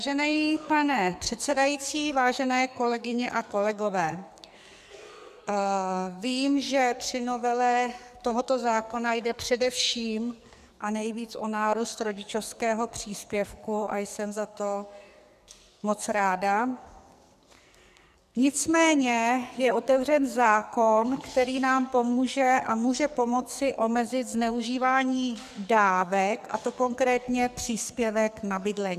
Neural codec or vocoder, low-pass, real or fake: codec, 44.1 kHz, 2.6 kbps, SNAC; 14.4 kHz; fake